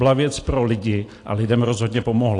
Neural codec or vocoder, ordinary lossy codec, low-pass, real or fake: none; AAC, 48 kbps; 10.8 kHz; real